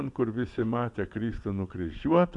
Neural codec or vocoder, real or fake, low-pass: vocoder, 24 kHz, 100 mel bands, Vocos; fake; 10.8 kHz